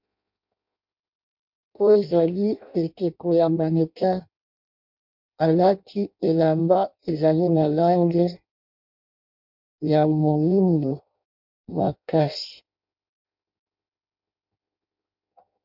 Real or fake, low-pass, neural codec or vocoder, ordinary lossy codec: fake; 5.4 kHz; codec, 16 kHz in and 24 kHz out, 0.6 kbps, FireRedTTS-2 codec; MP3, 48 kbps